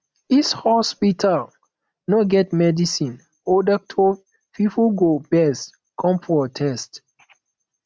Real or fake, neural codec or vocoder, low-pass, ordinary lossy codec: real; none; none; none